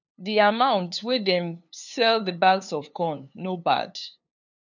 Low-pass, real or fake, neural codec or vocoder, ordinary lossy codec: 7.2 kHz; fake; codec, 16 kHz, 2 kbps, FunCodec, trained on LibriTTS, 25 frames a second; none